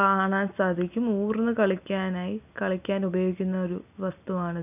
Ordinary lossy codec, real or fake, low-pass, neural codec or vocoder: none; real; 3.6 kHz; none